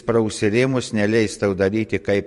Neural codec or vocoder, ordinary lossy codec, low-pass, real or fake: vocoder, 44.1 kHz, 128 mel bands every 512 samples, BigVGAN v2; MP3, 48 kbps; 14.4 kHz; fake